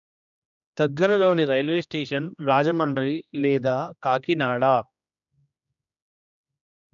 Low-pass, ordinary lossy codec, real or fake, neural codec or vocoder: 7.2 kHz; none; fake; codec, 16 kHz, 1 kbps, X-Codec, HuBERT features, trained on general audio